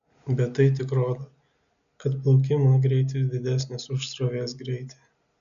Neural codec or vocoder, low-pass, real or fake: none; 7.2 kHz; real